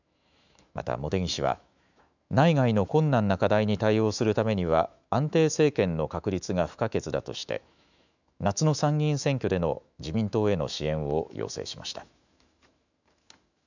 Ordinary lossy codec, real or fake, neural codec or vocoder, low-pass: none; fake; autoencoder, 48 kHz, 128 numbers a frame, DAC-VAE, trained on Japanese speech; 7.2 kHz